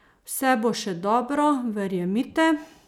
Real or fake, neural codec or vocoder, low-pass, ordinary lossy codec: real; none; 19.8 kHz; none